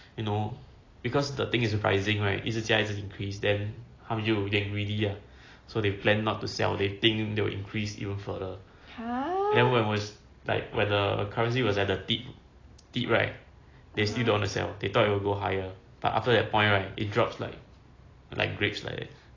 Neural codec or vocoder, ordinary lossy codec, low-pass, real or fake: none; AAC, 32 kbps; 7.2 kHz; real